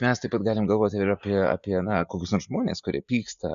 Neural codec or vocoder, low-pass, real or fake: none; 7.2 kHz; real